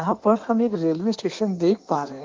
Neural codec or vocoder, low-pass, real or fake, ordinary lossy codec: codec, 16 kHz in and 24 kHz out, 1.1 kbps, FireRedTTS-2 codec; 7.2 kHz; fake; Opus, 32 kbps